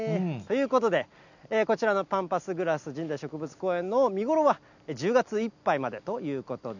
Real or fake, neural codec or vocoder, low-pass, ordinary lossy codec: real; none; 7.2 kHz; none